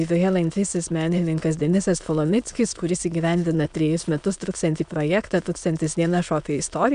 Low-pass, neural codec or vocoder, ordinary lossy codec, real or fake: 9.9 kHz; autoencoder, 22.05 kHz, a latent of 192 numbers a frame, VITS, trained on many speakers; MP3, 96 kbps; fake